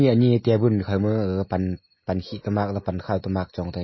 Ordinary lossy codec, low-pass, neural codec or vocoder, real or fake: MP3, 24 kbps; 7.2 kHz; none; real